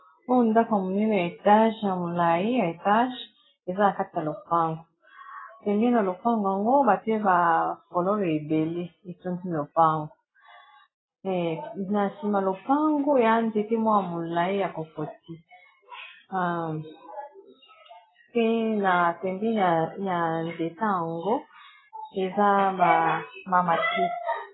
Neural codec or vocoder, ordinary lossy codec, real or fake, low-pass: none; AAC, 16 kbps; real; 7.2 kHz